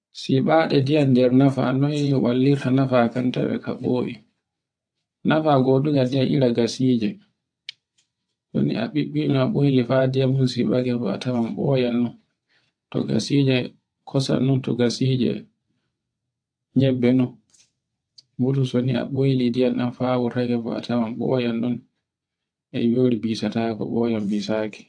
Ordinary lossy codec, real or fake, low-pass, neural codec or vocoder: AAC, 64 kbps; fake; 9.9 kHz; vocoder, 22.05 kHz, 80 mel bands, WaveNeXt